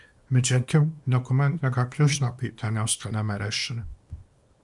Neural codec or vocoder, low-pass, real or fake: codec, 24 kHz, 0.9 kbps, WavTokenizer, small release; 10.8 kHz; fake